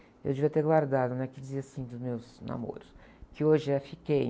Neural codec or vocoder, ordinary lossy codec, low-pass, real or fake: none; none; none; real